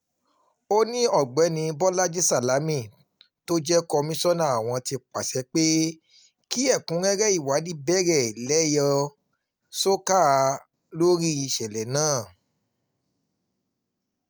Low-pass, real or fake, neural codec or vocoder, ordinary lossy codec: none; real; none; none